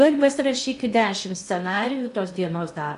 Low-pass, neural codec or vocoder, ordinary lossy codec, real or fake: 10.8 kHz; codec, 16 kHz in and 24 kHz out, 0.6 kbps, FocalCodec, streaming, 2048 codes; AAC, 96 kbps; fake